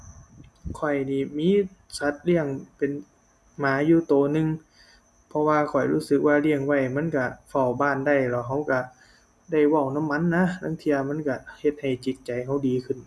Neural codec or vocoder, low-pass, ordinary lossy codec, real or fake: none; none; none; real